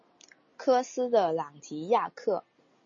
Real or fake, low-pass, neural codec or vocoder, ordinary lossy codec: real; 7.2 kHz; none; MP3, 32 kbps